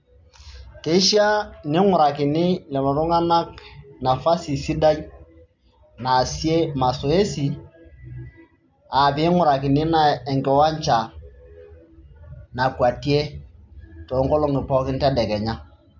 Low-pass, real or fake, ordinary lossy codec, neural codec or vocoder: 7.2 kHz; real; MP3, 64 kbps; none